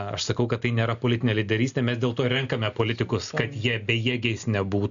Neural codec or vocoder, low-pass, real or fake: none; 7.2 kHz; real